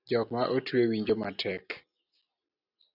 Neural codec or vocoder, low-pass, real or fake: none; 5.4 kHz; real